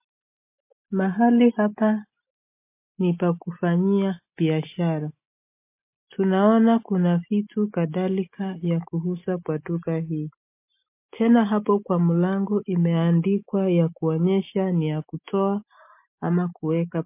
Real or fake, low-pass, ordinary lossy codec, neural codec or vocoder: real; 3.6 kHz; MP3, 24 kbps; none